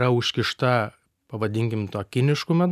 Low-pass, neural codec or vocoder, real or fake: 14.4 kHz; none; real